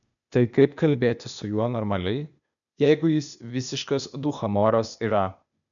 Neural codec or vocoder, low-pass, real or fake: codec, 16 kHz, 0.8 kbps, ZipCodec; 7.2 kHz; fake